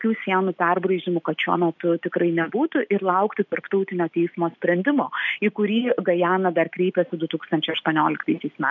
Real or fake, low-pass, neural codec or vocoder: real; 7.2 kHz; none